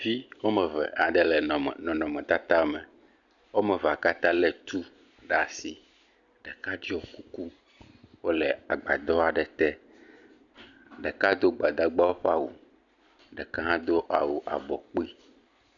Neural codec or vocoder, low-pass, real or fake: none; 7.2 kHz; real